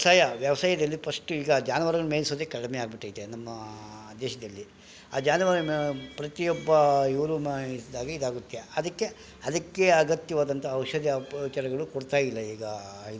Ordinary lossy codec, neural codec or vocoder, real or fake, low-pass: none; none; real; none